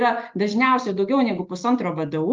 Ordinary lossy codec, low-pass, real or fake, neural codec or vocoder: Opus, 32 kbps; 7.2 kHz; real; none